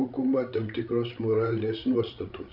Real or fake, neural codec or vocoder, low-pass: fake; codec, 16 kHz, 16 kbps, FreqCodec, larger model; 5.4 kHz